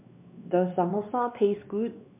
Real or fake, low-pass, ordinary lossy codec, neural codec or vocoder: fake; 3.6 kHz; MP3, 32 kbps; codec, 16 kHz, 2 kbps, X-Codec, WavLM features, trained on Multilingual LibriSpeech